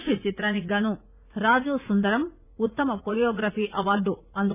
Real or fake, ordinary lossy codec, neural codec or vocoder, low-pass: fake; none; vocoder, 44.1 kHz, 80 mel bands, Vocos; 3.6 kHz